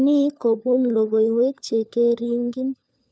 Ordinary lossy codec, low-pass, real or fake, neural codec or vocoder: none; none; fake; codec, 16 kHz, 4 kbps, FunCodec, trained on LibriTTS, 50 frames a second